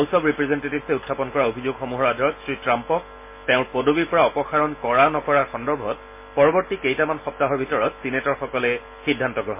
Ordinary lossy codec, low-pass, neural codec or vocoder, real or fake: MP3, 24 kbps; 3.6 kHz; none; real